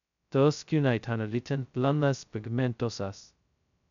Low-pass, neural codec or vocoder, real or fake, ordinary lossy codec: 7.2 kHz; codec, 16 kHz, 0.2 kbps, FocalCodec; fake; none